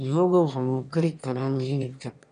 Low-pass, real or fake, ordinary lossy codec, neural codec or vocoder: 9.9 kHz; fake; none; autoencoder, 22.05 kHz, a latent of 192 numbers a frame, VITS, trained on one speaker